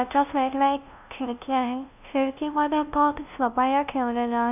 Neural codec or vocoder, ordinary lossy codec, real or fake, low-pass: codec, 16 kHz, 0.5 kbps, FunCodec, trained on LibriTTS, 25 frames a second; none; fake; 3.6 kHz